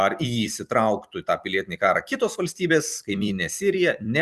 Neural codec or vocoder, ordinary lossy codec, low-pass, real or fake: vocoder, 44.1 kHz, 128 mel bands every 256 samples, BigVGAN v2; Opus, 64 kbps; 14.4 kHz; fake